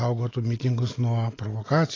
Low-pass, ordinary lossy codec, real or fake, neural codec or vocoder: 7.2 kHz; AAC, 32 kbps; real; none